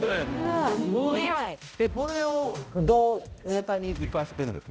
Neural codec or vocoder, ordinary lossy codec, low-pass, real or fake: codec, 16 kHz, 0.5 kbps, X-Codec, HuBERT features, trained on balanced general audio; none; none; fake